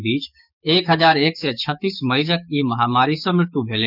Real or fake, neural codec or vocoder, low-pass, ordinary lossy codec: fake; codec, 16 kHz, 6 kbps, DAC; 5.4 kHz; none